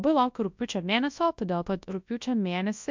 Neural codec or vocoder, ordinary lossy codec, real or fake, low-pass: codec, 24 kHz, 0.9 kbps, WavTokenizer, large speech release; MP3, 64 kbps; fake; 7.2 kHz